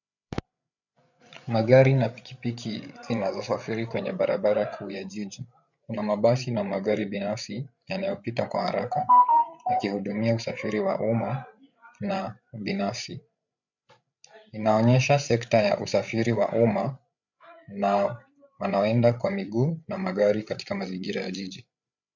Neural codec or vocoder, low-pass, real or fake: codec, 16 kHz, 8 kbps, FreqCodec, larger model; 7.2 kHz; fake